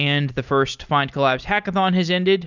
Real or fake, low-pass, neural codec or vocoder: real; 7.2 kHz; none